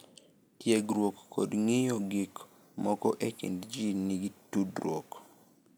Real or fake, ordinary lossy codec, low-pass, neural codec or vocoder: real; none; none; none